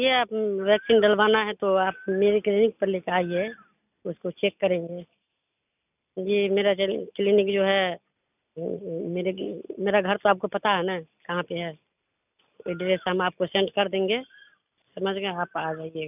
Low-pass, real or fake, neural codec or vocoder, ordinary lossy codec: 3.6 kHz; real; none; none